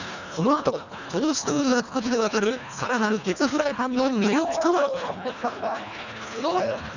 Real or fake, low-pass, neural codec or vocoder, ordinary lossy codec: fake; 7.2 kHz; codec, 24 kHz, 1.5 kbps, HILCodec; none